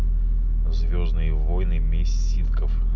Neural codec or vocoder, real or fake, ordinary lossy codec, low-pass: none; real; none; 7.2 kHz